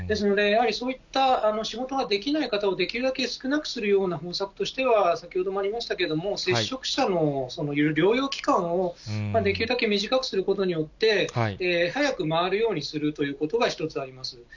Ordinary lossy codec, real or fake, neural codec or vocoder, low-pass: none; real; none; 7.2 kHz